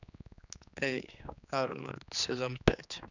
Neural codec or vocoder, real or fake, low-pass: codec, 16 kHz, 2 kbps, X-Codec, HuBERT features, trained on general audio; fake; 7.2 kHz